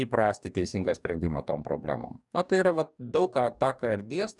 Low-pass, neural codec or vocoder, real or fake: 10.8 kHz; codec, 44.1 kHz, 2.6 kbps, DAC; fake